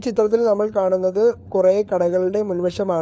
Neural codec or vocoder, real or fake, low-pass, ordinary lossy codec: codec, 16 kHz, 4 kbps, FunCodec, trained on LibriTTS, 50 frames a second; fake; none; none